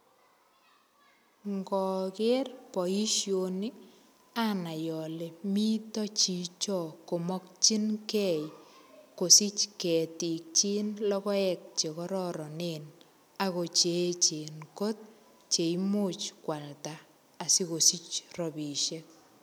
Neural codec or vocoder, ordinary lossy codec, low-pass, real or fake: none; none; none; real